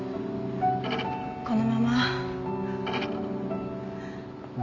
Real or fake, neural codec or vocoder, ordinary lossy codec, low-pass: real; none; none; 7.2 kHz